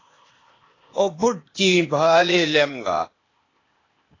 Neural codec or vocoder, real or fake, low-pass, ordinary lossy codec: codec, 16 kHz, 0.8 kbps, ZipCodec; fake; 7.2 kHz; AAC, 32 kbps